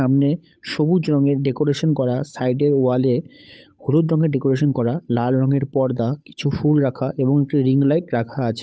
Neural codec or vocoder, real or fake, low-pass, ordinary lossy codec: codec, 16 kHz, 8 kbps, FunCodec, trained on Chinese and English, 25 frames a second; fake; none; none